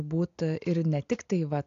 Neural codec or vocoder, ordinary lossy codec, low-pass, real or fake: none; AAC, 64 kbps; 7.2 kHz; real